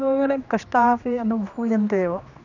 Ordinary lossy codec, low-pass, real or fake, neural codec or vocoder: none; 7.2 kHz; fake; codec, 16 kHz, 2 kbps, X-Codec, HuBERT features, trained on general audio